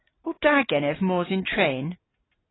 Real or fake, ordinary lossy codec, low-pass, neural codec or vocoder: real; AAC, 16 kbps; 7.2 kHz; none